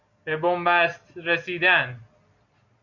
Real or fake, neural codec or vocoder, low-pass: real; none; 7.2 kHz